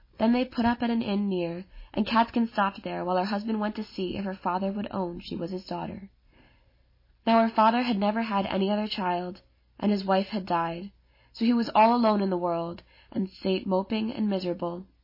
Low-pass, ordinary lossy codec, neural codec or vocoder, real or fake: 5.4 kHz; MP3, 24 kbps; none; real